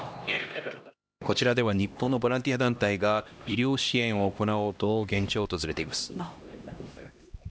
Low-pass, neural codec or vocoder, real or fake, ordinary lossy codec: none; codec, 16 kHz, 1 kbps, X-Codec, HuBERT features, trained on LibriSpeech; fake; none